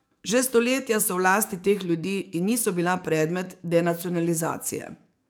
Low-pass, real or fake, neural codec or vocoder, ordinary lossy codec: none; fake; codec, 44.1 kHz, 7.8 kbps, DAC; none